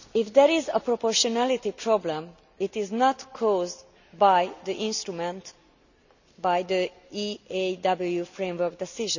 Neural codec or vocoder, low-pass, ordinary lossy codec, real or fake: none; 7.2 kHz; none; real